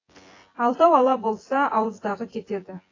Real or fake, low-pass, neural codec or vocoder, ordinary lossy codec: fake; 7.2 kHz; vocoder, 24 kHz, 100 mel bands, Vocos; AAC, 32 kbps